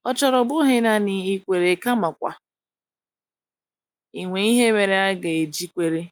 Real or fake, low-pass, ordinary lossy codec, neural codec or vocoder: real; 19.8 kHz; none; none